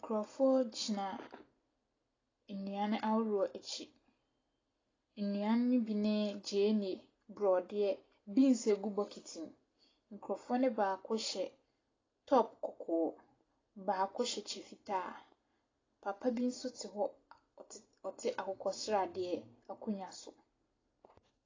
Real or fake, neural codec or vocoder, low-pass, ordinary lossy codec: real; none; 7.2 kHz; AAC, 32 kbps